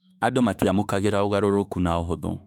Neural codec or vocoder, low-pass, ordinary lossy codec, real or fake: autoencoder, 48 kHz, 32 numbers a frame, DAC-VAE, trained on Japanese speech; 19.8 kHz; none; fake